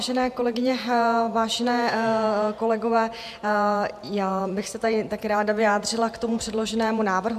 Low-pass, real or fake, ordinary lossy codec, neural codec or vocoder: 14.4 kHz; fake; MP3, 96 kbps; vocoder, 48 kHz, 128 mel bands, Vocos